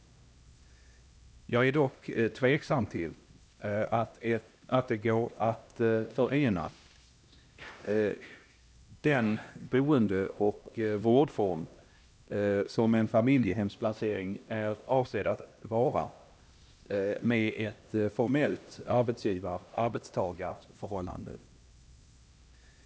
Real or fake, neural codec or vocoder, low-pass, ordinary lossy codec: fake; codec, 16 kHz, 1 kbps, X-Codec, HuBERT features, trained on LibriSpeech; none; none